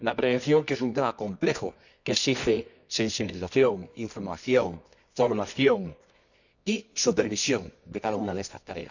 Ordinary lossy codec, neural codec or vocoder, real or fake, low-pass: none; codec, 24 kHz, 0.9 kbps, WavTokenizer, medium music audio release; fake; 7.2 kHz